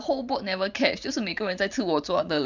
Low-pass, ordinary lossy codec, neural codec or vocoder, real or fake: 7.2 kHz; none; vocoder, 44.1 kHz, 128 mel bands every 512 samples, BigVGAN v2; fake